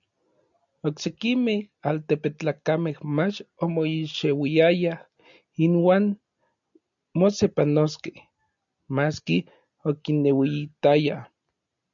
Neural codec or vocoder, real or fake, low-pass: none; real; 7.2 kHz